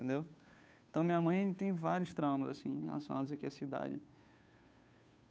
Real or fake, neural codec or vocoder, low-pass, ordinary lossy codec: fake; codec, 16 kHz, 2 kbps, FunCodec, trained on Chinese and English, 25 frames a second; none; none